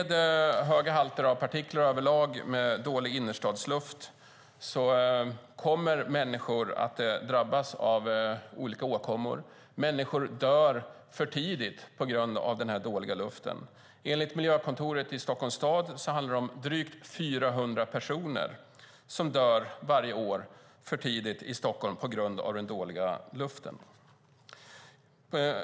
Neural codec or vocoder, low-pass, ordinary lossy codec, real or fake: none; none; none; real